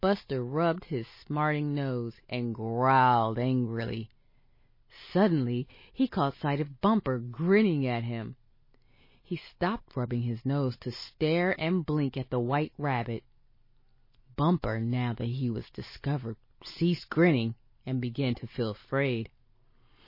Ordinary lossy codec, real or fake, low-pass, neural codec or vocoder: MP3, 24 kbps; real; 5.4 kHz; none